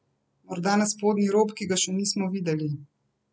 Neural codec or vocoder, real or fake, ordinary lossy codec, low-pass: none; real; none; none